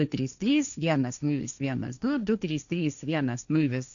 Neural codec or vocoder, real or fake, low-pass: codec, 16 kHz, 1.1 kbps, Voila-Tokenizer; fake; 7.2 kHz